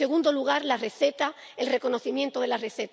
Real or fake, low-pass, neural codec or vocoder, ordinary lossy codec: real; none; none; none